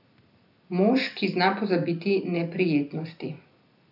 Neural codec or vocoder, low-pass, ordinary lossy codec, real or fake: none; 5.4 kHz; none; real